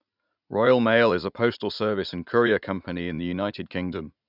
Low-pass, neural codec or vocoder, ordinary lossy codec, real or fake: 5.4 kHz; vocoder, 44.1 kHz, 80 mel bands, Vocos; none; fake